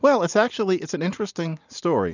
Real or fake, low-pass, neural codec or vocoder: real; 7.2 kHz; none